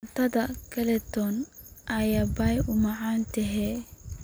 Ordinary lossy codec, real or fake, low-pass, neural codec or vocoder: none; real; none; none